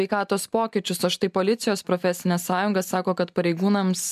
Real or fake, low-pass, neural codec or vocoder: real; 14.4 kHz; none